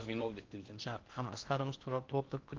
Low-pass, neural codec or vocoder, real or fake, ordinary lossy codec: 7.2 kHz; codec, 16 kHz in and 24 kHz out, 0.8 kbps, FocalCodec, streaming, 65536 codes; fake; Opus, 24 kbps